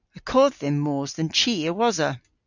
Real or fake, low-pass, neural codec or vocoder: real; 7.2 kHz; none